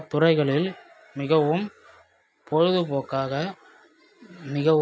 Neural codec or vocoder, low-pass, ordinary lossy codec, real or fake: none; none; none; real